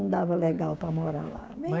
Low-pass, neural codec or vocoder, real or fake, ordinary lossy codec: none; codec, 16 kHz, 6 kbps, DAC; fake; none